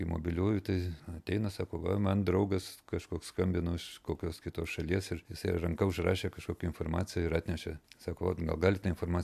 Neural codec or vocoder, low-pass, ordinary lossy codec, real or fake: none; 14.4 kHz; AAC, 96 kbps; real